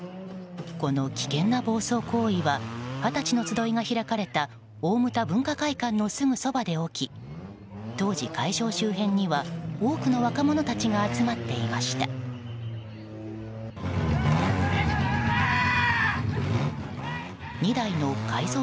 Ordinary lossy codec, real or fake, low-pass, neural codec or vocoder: none; real; none; none